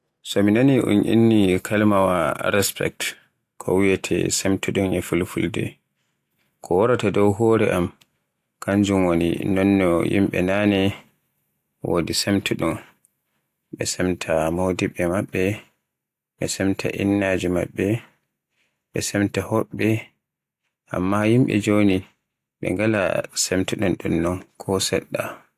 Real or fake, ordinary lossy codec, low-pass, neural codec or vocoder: real; AAC, 64 kbps; 14.4 kHz; none